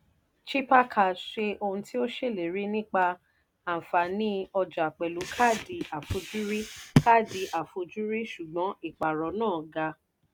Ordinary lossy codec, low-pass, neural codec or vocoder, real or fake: none; none; none; real